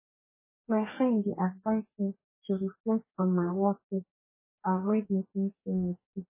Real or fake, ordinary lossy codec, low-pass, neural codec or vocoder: fake; MP3, 16 kbps; 3.6 kHz; codec, 44.1 kHz, 2.6 kbps, DAC